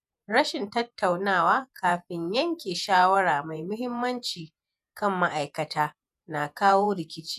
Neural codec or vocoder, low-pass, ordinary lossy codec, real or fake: vocoder, 48 kHz, 128 mel bands, Vocos; 14.4 kHz; none; fake